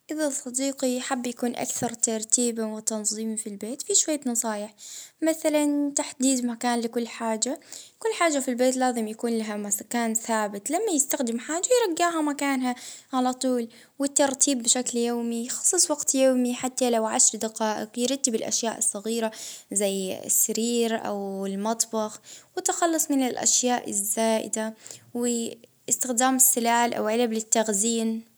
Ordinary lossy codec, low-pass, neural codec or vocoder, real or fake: none; none; none; real